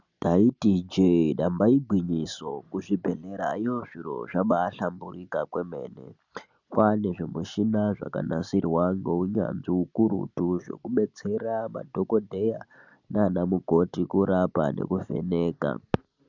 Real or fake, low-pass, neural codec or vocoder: real; 7.2 kHz; none